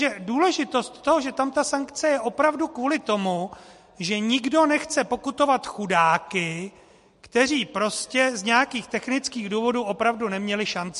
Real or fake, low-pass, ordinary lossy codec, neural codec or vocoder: real; 14.4 kHz; MP3, 48 kbps; none